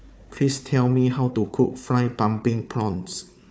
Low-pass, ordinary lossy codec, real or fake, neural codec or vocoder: none; none; fake; codec, 16 kHz, 4 kbps, FunCodec, trained on Chinese and English, 50 frames a second